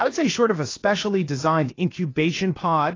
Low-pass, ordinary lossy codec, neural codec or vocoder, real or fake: 7.2 kHz; AAC, 32 kbps; codec, 16 kHz, about 1 kbps, DyCAST, with the encoder's durations; fake